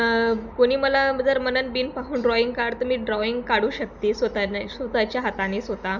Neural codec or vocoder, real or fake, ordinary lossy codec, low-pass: none; real; none; 7.2 kHz